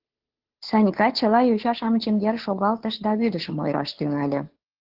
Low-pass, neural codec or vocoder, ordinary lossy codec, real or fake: 5.4 kHz; codec, 16 kHz, 2 kbps, FunCodec, trained on Chinese and English, 25 frames a second; Opus, 16 kbps; fake